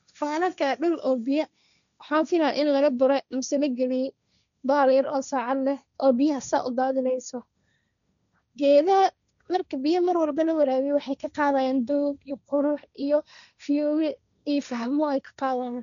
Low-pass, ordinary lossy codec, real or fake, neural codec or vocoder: 7.2 kHz; none; fake; codec, 16 kHz, 1.1 kbps, Voila-Tokenizer